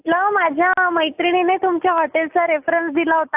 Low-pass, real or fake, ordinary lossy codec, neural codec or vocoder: 3.6 kHz; real; AAC, 32 kbps; none